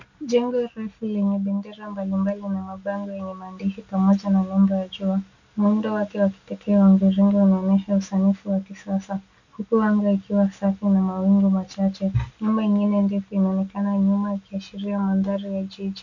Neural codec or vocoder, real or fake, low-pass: none; real; 7.2 kHz